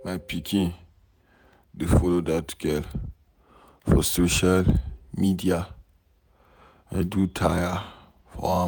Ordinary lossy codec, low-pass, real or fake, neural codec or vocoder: none; none; fake; vocoder, 48 kHz, 128 mel bands, Vocos